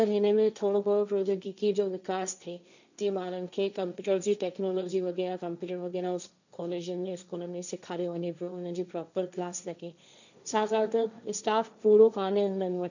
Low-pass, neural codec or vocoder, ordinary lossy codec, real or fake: 7.2 kHz; codec, 16 kHz, 1.1 kbps, Voila-Tokenizer; none; fake